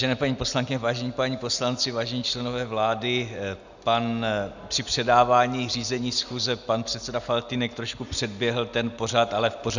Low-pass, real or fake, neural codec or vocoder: 7.2 kHz; real; none